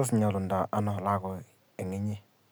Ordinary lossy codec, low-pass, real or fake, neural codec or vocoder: none; none; real; none